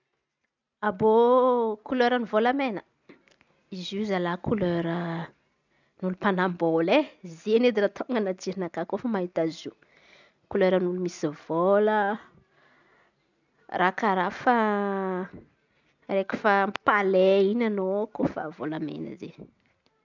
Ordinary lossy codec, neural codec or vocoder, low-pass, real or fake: none; none; 7.2 kHz; real